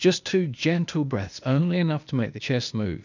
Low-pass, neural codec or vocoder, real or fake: 7.2 kHz; codec, 16 kHz, 0.8 kbps, ZipCodec; fake